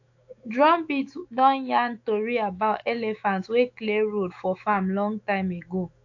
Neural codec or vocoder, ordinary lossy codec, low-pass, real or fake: autoencoder, 48 kHz, 128 numbers a frame, DAC-VAE, trained on Japanese speech; AAC, 48 kbps; 7.2 kHz; fake